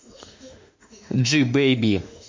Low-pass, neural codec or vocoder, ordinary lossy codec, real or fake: 7.2 kHz; autoencoder, 48 kHz, 32 numbers a frame, DAC-VAE, trained on Japanese speech; MP3, 48 kbps; fake